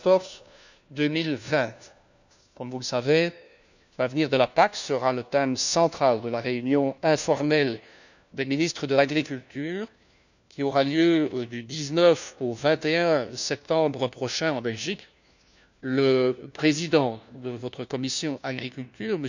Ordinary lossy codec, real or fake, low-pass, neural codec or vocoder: none; fake; 7.2 kHz; codec, 16 kHz, 1 kbps, FunCodec, trained on LibriTTS, 50 frames a second